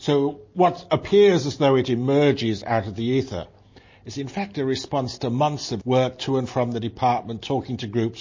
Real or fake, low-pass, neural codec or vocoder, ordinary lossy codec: real; 7.2 kHz; none; MP3, 32 kbps